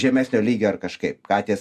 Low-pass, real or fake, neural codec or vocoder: 14.4 kHz; real; none